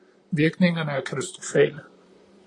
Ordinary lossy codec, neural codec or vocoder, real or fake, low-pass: AAC, 32 kbps; vocoder, 44.1 kHz, 128 mel bands, Pupu-Vocoder; fake; 10.8 kHz